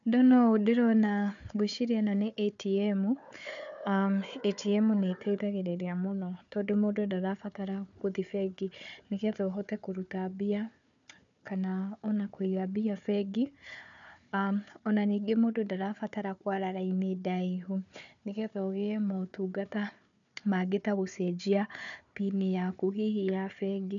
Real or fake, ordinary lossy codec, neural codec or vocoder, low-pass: fake; none; codec, 16 kHz, 4 kbps, FunCodec, trained on Chinese and English, 50 frames a second; 7.2 kHz